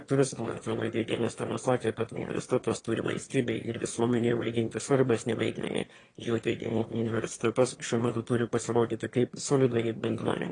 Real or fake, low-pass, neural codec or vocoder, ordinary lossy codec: fake; 9.9 kHz; autoencoder, 22.05 kHz, a latent of 192 numbers a frame, VITS, trained on one speaker; AAC, 32 kbps